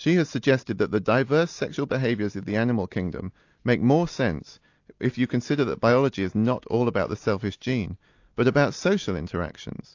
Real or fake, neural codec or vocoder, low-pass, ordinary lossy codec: real; none; 7.2 kHz; AAC, 48 kbps